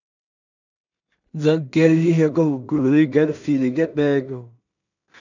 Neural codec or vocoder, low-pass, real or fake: codec, 16 kHz in and 24 kHz out, 0.4 kbps, LongCat-Audio-Codec, two codebook decoder; 7.2 kHz; fake